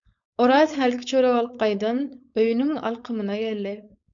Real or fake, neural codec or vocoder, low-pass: fake; codec, 16 kHz, 4.8 kbps, FACodec; 7.2 kHz